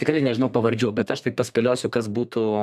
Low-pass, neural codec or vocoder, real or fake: 14.4 kHz; codec, 32 kHz, 1.9 kbps, SNAC; fake